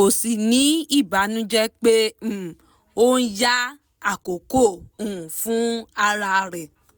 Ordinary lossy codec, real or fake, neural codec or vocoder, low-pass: none; real; none; none